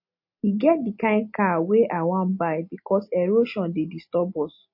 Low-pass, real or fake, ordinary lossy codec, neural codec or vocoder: 5.4 kHz; real; MP3, 32 kbps; none